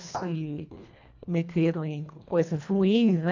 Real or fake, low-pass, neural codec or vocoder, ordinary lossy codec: fake; 7.2 kHz; codec, 24 kHz, 1.5 kbps, HILCodec; none